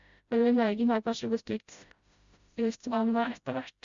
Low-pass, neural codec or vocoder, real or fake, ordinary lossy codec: 7.2 kHz; codec, 16 kHz, 0.5 kbps, FreqCodec, smaller model; fake; Opus, 64 kbps